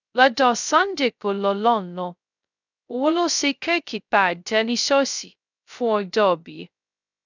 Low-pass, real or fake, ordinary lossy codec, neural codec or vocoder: 7.2 kHz; fake; none; codec, 16 kHz, 0.2 kbps, FocalCodec